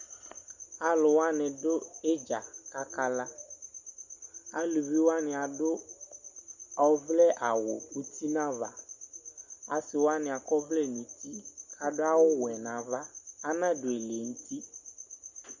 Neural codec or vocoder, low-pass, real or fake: none; 7.2 kHz; real